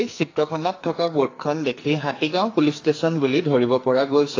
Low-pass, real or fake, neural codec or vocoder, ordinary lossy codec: 7.2 kHz; fake; codec, 44.1 kHz, 2.6 kbps, SNAC; AAC, 32 kbps